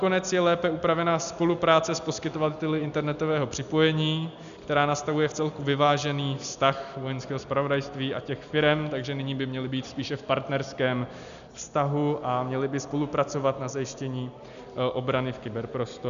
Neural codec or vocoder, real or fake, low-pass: none; real; 7.2 kHz